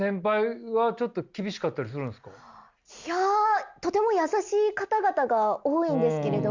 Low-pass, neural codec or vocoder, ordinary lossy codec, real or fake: 7.2 kHz; none; Opus, 64 kbps; real